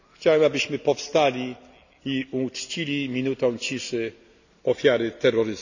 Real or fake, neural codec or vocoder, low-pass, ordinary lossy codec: real; none; 7.2 kHz; none